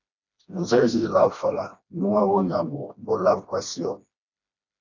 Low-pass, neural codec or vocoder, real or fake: 7.2 kHz; codec, 16 kHz, 1 kbps, FreqCodec, smaller model; fake